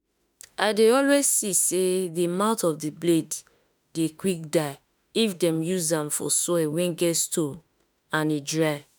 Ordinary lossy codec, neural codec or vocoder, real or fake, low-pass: none; autoencoder, 48 kHz, 32 numbers a frame, DAC-VAE, trained on Japanese speech; fake; none